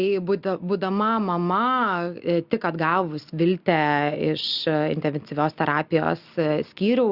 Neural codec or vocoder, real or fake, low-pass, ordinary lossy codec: none; real; 5.4 kHz; Opus, 64 kbps